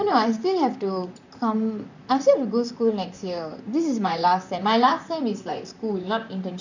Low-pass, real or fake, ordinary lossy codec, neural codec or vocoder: 7.2 kHz; fake; none; vocoder, 44.1 kHz, 128 mel bands, Pupu-Vocoder